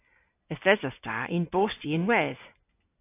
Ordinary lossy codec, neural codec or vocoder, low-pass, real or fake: AAC, 24 kbps; none; 3.6 kHz; real